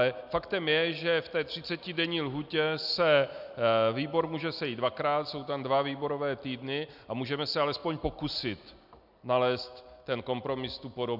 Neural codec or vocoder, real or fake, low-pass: none; real; 5.4 kHz